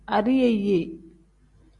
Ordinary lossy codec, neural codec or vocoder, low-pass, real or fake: Opus, 64 kbps; none; 10.8 kHz; real